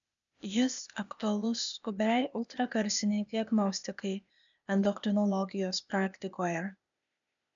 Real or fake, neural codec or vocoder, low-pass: fake; codec, 16 kHz, 0.8 kbps, ZipCodec; 7.2 kHz